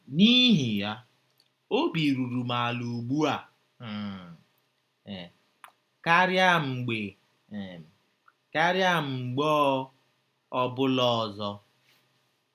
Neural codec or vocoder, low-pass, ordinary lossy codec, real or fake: none; 14.4 kHz; none; real